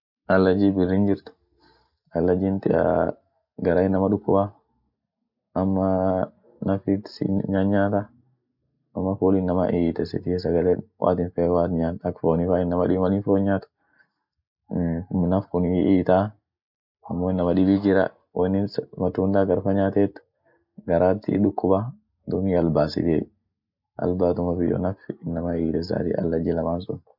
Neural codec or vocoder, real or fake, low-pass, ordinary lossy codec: none; real; 5.4 kHz; none